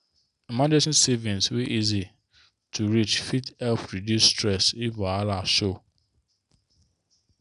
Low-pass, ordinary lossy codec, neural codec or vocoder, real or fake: 10.8 kHz; none; none; real